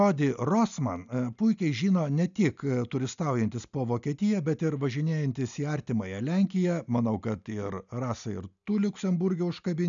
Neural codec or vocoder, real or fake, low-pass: none; real; 7.2 kHz